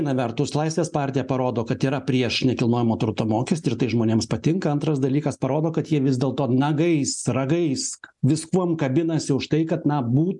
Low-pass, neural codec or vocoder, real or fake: 10.8 kHz; none; real